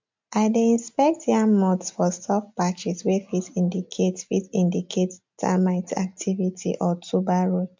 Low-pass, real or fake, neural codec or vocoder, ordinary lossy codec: 7.2 kHz; real; none; MP3, 64 kbps